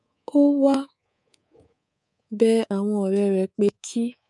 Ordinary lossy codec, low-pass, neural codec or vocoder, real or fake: none; 10.8 kHz; codec, 24 kHz, 3.1 kbps, DualCodec; fake